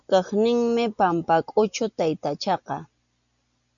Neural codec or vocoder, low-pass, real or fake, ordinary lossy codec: none; 7.2 kHz; real; MP3, 96 kbps